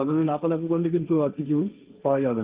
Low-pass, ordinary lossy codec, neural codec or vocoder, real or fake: 3.6 kHz; Opus, 24 kbps; codec, 16 kHz, 1.1 kbps, Voila-Tokenizer; fake